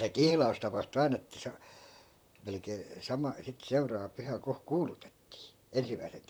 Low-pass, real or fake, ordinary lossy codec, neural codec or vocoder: none; fake; none; vocoder, 44.1 kHz, 128 mel bands, Pupu-Vocoder